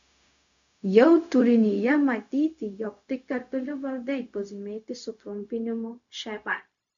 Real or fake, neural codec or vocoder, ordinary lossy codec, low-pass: fake; codec, 16 kHz, 0.4 kbps, LongCat-Audio-Codec; AAC, 64 kbps; 7.2 kHz